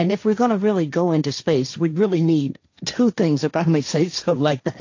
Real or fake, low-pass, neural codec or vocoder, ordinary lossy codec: fake; 7.2 kHz; codec, 16 kHz, 1.1 kbps, Voila-Tokenizer; AAC, 48 kbps